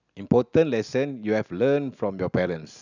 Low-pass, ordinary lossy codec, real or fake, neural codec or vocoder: 7.2 kHz; none; real; none